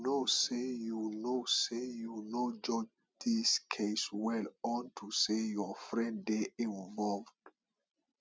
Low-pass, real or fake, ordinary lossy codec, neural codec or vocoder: none; real; none; none